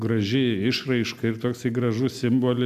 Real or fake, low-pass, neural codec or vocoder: real; 14.4 kHz; none